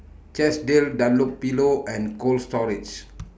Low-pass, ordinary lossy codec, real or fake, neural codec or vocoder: none; none; real; none